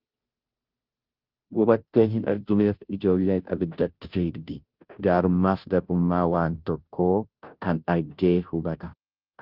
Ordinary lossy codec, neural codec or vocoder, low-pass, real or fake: Opus, 24 kbps; codec, 16 kHz, 0.5 kbps, FunCodec, trained on Chinese and English, 25 frames a second; 5.4 kHz; fake